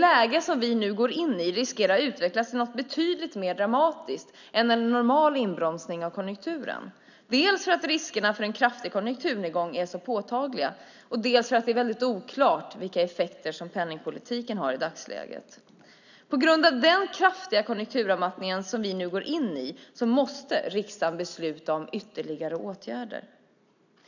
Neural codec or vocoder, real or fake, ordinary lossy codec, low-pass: none; real; none; 7.2 kHz